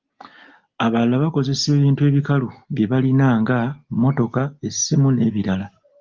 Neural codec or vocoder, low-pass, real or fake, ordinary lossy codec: none; 7.2 kHz; real; Opus, 32 kbps